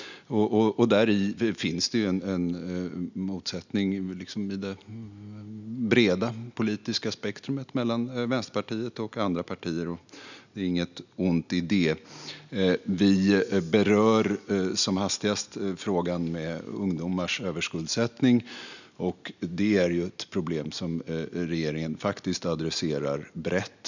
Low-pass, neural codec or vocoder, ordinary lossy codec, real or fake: 7.2 kHz; none; none; real